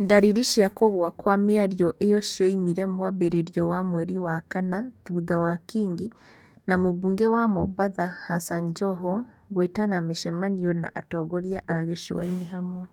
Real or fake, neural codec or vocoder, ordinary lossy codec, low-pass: fake; codec, 44.1 kHz, 2.6 kbps, DAC; none; 19.8 kHz